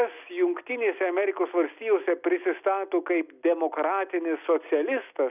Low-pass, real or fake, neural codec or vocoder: 3.6 kHz; real; none